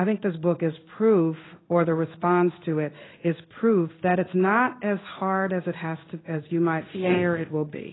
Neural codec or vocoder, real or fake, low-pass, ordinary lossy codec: codec, 16 kHz in and 24 kHz out, 1 kbps, XY-Tokenizer; fake; 7.2 kHz; AAC, 16 kbps